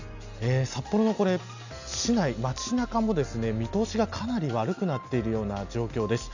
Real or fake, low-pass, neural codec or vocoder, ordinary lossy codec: real; 7.2 kHz; none; none